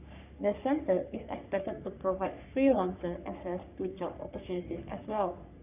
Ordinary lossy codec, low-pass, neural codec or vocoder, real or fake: none; 3.6 kHz; codec, 44.1 kHz, 3.4 kbps, Pupu-Codec; fake